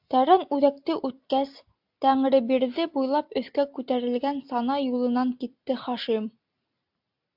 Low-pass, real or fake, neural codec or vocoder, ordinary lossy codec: 5.4 kHz; real; none; AAC, 48 kbps